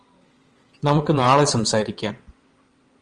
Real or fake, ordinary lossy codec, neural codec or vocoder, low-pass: fake; Opus, 24 kbps; vocoder, 22.05 kHz, 80 mel bands, WaveNeXt; 9.9 kHz